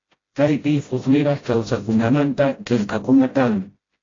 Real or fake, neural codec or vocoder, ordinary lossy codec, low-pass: fake; codec, 16 kHz, 0.5 kbps, FreqCodec, smaller model; AAC, 32 kbps; 7.2 kHz